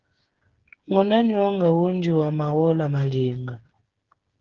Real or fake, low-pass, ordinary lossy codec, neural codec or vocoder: fake; 7.2 kHz; Opus, 16 kbps; codec, 16 kHz, 8 kbps, FreqCodec, smaller model